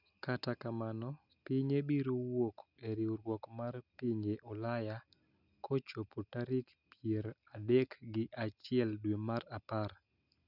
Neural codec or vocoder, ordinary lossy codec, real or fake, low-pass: none; none; real; 5.4 kHz